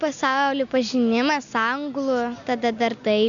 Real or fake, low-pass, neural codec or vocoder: real; 7.2 kHz; none